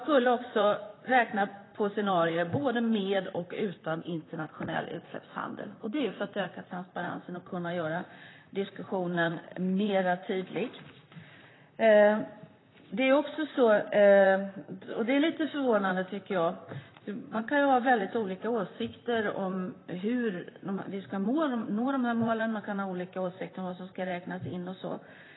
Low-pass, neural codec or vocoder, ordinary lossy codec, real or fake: 7.2 kHz; vocoder, 44.1 kHz, 128 mel bands, Pupu-Vocoder; AAC, 16 kbps; fake